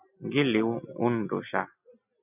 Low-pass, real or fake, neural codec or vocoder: 3.6 kHz; real; none